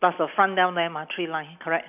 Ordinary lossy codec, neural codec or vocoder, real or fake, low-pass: none; none; real; 3.6 kHz